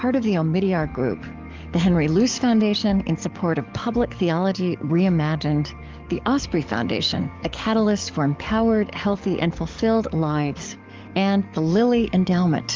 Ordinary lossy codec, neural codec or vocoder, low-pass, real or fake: Opus, 32 kbps; codec, 44.1 kHz, 7.8 kbps, DAC; 7.2 kHz; fake